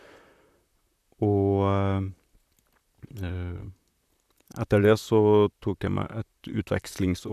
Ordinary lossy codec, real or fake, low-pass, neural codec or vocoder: none; fake; 14.4 kHz; vocoder, 44.1 kHz, 128 mel bands, Pupu-Vocoder